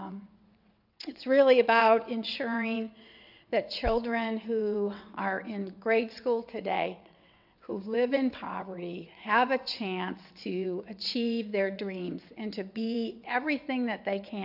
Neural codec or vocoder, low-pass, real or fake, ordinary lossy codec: vocoder, 22.05 kHz, 80 mel bands, WaveNeXt; 5.4 kHz; fake; AAC, 48 kbps